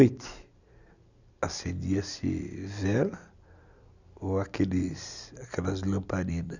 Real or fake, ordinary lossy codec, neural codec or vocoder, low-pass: real; none; none; 7.2 kHz